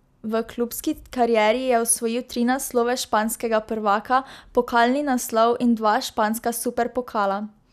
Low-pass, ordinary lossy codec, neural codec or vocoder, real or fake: 14.4 kHz; none; none; real